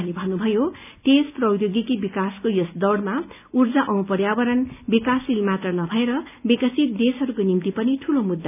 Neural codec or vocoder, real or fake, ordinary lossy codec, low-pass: none; real; none; 3.6 kHz